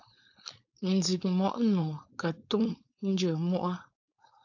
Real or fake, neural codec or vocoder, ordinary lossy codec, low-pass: fake; codec, 16 kHz, 4.8 kbps, FACodec; AAC, 48 kbps; 7.2 kHz